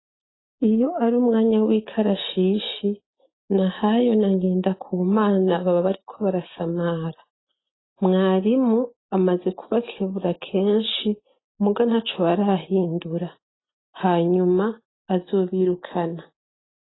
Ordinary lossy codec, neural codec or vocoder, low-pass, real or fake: AAC, 16 kbps; none; 7.2 kHz; real